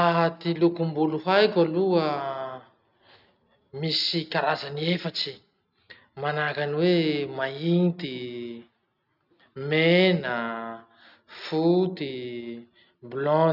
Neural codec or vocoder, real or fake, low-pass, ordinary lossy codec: none; real; 5.4 kHz; none